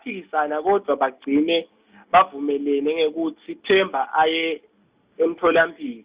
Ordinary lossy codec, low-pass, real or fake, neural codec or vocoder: Opus, 64 kbps; 3.6 kHz; real; none